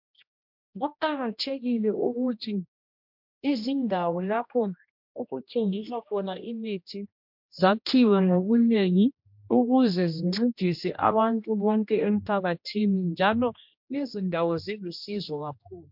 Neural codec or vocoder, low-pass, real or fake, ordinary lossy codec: codec, 16 kHz, 1 kbps, X-Codec, HuBERT features, trained on general audio; 5.4 kHz; fake; MP3, 48 kbps